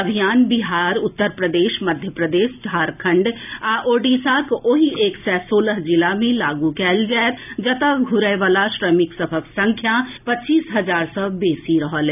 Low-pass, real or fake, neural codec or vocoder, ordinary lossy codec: 3.6 kHz; real; none; none